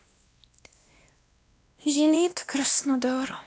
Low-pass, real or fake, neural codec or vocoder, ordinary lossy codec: none; fake; codec, 16 kHz, 1 kbps, X-Codec, WavLM features, trained on Multilingual LibriSpeech; none